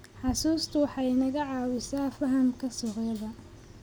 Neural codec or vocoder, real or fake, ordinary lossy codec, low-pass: none; real; none; none